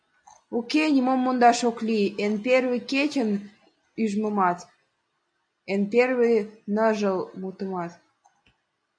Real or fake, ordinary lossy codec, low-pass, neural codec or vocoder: real; MP3, 96 kbps; 9.9 kHz; none